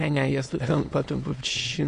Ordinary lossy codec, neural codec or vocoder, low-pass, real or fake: MP3, 48 kbps; autoencoder, 22.05 kHz, a latent of 192 numbers a frame, VITS, trained on many speakers; 9.9 kHz; fake